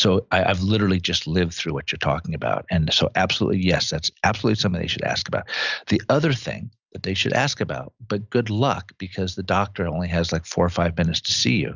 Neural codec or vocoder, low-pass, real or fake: none; 7.2 kHz; real